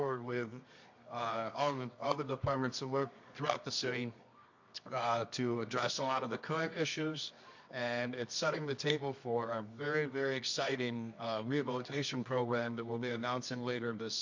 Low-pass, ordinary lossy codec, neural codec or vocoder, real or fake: 7.2 kHz; MP3, 48 kbps; codec, 24 kHz, 0.9 kbps, WavTokenizer, medium music audio release; fake